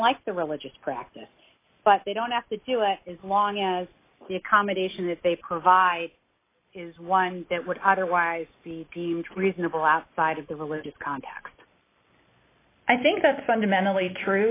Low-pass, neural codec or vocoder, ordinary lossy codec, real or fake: 3.6 kHz; none; AAC, 24 kbps; real